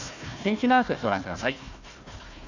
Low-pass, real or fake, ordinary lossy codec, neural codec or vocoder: 7.2 kHz; fake; none; codec, 16 kHz, 1 kbps, FunCodec, trained on Chinese and English, 50 frames a second